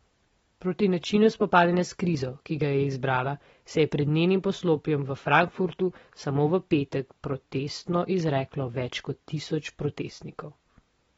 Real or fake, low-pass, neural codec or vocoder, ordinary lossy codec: real; 19.8 kHz; none; AAC, 24 kbps